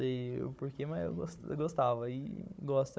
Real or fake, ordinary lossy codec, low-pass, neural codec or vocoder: fake; none; none; codec, 16 kHz, 16 kbps, FunCodec, trained on Chinese and English, 50 frames a second